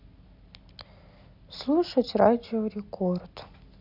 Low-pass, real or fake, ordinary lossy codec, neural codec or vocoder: 5.4 kHz; real; none; none